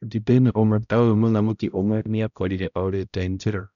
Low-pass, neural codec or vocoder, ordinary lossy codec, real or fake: 7.2 kHz; codec, 16 kHz, 0.5 kbps, X-Codec, HuBERT features, trained on balanced general audio; none; fake